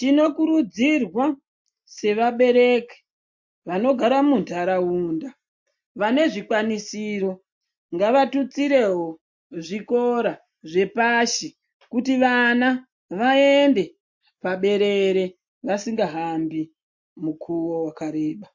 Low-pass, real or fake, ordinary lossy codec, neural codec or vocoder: 7.2 kHz; real; MP3, 48 kbps; none